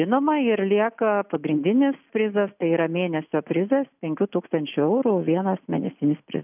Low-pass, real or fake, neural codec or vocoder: 3.6 kHz; real; none